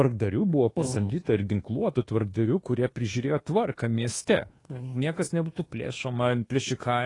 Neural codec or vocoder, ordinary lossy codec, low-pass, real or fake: codec, 24 kHz, 1.2 kbps, DualCodec; AAC, 32 kbps; 10.8 kHz; fake